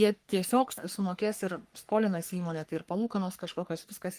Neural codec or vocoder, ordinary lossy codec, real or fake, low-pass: codec, 44.1 kHz, 3.4 kbps, Pupu-Codec; Opus, 32 kbps; fake; 14.4 kHz